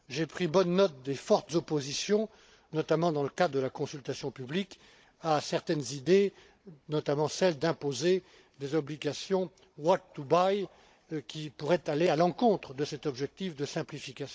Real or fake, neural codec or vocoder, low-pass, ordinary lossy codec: fake; codec, 16 kHz, 16 kbps, FunCodec, trained on Chinese and English, 50 frames a second; none; none